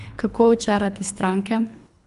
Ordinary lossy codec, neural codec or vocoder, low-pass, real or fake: none; codec, 24 kHz, 3 kbps, HILCodec; 10.8 kHz; fake